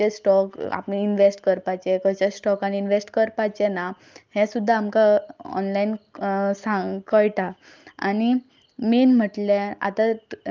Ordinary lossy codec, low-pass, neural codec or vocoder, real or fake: Opus, 24 kbps; 7.2 kHz; none; real